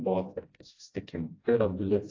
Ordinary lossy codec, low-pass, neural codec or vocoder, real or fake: AAC, 32 kbps; 7.2 kHz; codec, 16 kHz, 1 kbps, FreqCodec, smaller model; fake